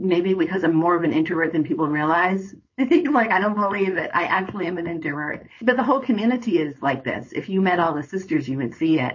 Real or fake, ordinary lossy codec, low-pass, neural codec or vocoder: fake; MP3, 32 kbps; 7.2 kHz; codec, 16 kHz, 4.8 kbps, FACodec